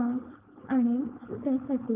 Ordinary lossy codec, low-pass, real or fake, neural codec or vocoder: Opus, 24 kbps; 3.6 kHz; fake; codec, 16 kHz, 4.8 kbps, FACodec